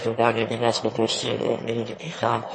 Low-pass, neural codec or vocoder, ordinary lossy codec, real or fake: 9.9 kHz; autoencoder, 22.05 kHz, a latent of 192 numbers a frame, VITS, trained on one speaker; MP3, 32 kbps; fake